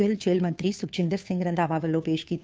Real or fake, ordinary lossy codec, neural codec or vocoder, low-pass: fake; none; codec, 16 kHz, 2 kbps, FunCodec, trained on Chinese and English, 25 frames a second; none